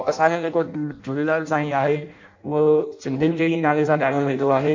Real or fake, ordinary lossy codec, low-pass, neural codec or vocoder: fake; MP3, 64 kbps; 7.2 kHz; codec, 16 kHz in and 24 kHz out, 0.6 kbps, FireRedTTS-2 codec